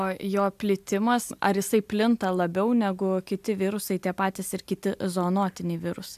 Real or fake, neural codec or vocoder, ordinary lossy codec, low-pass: real; none; AAC, 96 kbps; 14.4 kHz